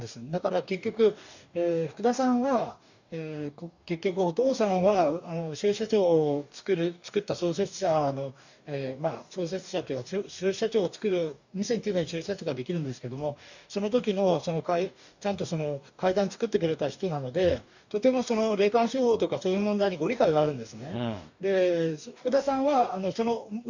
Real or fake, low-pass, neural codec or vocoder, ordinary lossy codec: fake; 7.2 kHz; codec, 44.1 kHz, 2.6 kbps, DAC; none